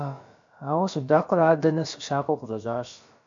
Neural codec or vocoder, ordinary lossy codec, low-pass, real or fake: codec, 16 kHz, about 1 kbps, DyCAST, with the encoder's durations; MP3, 64 kbps; 7.2 kHz; fake